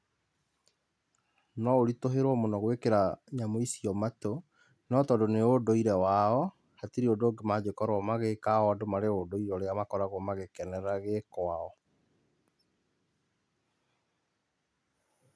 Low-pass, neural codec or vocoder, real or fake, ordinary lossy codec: none; none; real; none